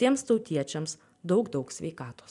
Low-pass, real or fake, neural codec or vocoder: 10.8 kHz; fake; vocoder, 44.1 kHz, 128 mel bands every 256 samples, BigVGAN v2